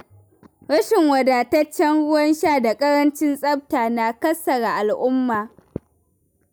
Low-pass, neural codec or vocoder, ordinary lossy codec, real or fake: none; none; none; real